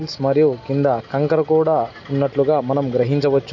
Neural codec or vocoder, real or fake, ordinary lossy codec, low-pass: none; real; none; 7.2 kHz